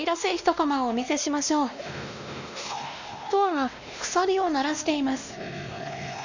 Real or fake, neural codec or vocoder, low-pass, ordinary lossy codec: fake; codec, 16 kHz, 1 kbps, X-Codec, WavLM features, trained on Multilingual LibriSpeech; 7.2 kHz; none